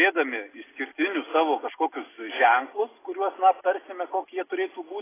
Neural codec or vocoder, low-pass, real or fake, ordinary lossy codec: none; 3.6 kHz; real; AAC, 16 kbps